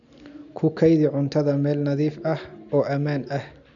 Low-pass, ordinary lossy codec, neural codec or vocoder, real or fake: 7.2 kHz; none; none; real